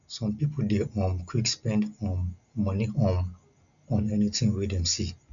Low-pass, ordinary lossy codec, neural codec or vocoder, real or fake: 7.2 kHz; none; none; real